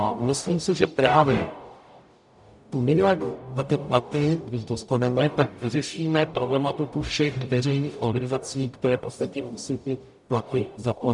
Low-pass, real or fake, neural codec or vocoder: 10.8 kHz; fake; codec, 44.1 kHz, 0.9 kbps, DAC